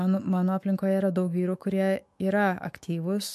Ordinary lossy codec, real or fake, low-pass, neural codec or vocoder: MP3, 64 kbps; fake; 14.4 kHz; autoencoder, 48 kHz, 128 numbers a frame, DAC-VAE, trained on Japanese speech